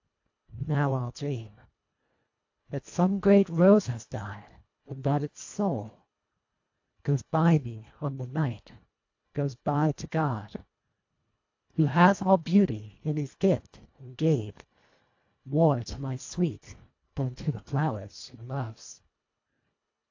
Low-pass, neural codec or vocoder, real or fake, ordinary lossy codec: 7.2 kHz; codec, 24 kHz, 1.5 kbps, HILCodec; fake; AAC, 48 kbps